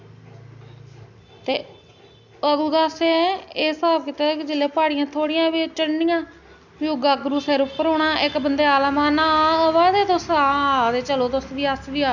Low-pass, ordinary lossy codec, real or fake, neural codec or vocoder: 7.2 kHz; none; real; none